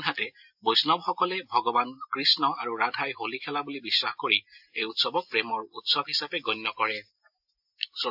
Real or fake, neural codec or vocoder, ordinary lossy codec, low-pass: real; none; AAC, 48 kbps; 5.4 kHz